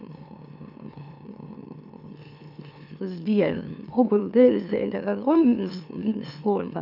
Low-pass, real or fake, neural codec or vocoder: 5.4 kHz; fake; autoencoder, 44.1 kHz, a latent of 192 numbers a frame, MeloTTS